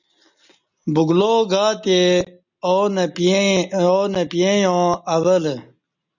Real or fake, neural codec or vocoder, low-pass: real; none; 7.2 kHz